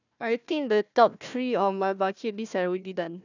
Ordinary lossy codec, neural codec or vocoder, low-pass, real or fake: none; codec, 16 kHz, 1 kbps, FunCodec, trained on Chinese and English, 50 frames a second; 7.2 kHz; fake